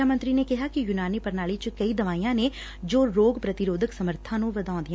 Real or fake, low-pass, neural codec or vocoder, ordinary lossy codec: real; none; none; none